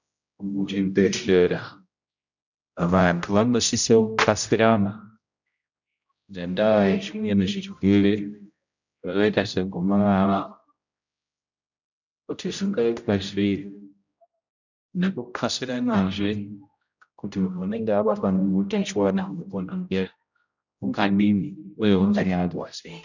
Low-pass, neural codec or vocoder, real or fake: 7.2 kHz; codec, 16 kHz, 0.5 kbps, X-Codec, HuBERT features, trained on general audio; fake